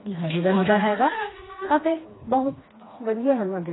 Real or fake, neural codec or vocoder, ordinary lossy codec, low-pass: fake; codec, 16 kHz, 2 kbps, FreqCodec, smaller model; AAC, 16 kbps; 7.2 kHz